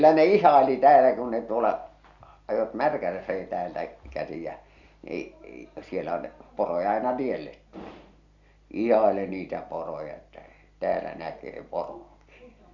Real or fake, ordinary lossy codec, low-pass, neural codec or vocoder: real; Opus, 64 kbps; 7.2 kHz; none